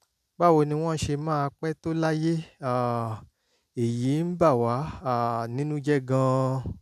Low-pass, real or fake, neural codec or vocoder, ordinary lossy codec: 14.4 kHz; real; none; none